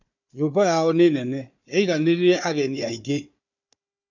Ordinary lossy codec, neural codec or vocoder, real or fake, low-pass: none; codec, 16 kHz, 4 kbps, FunCodec, trained on Chinese and English, 50 frames a second; fake; 7.2 kHz